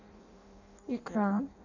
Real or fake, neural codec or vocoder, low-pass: fake; codec, 16 kHz in and 24 kHz out, 0.6 kbps, FireRedTTS-2 codec; 7.2 kHz